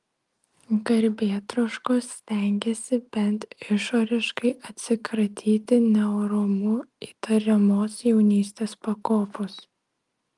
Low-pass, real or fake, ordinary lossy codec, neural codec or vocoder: 10.8 kHz; real; Opus, 32 kbps; none